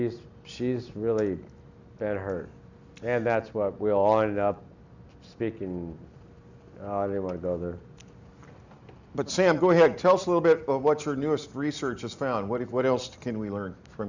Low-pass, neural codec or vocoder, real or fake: 7.2 kHz; none; real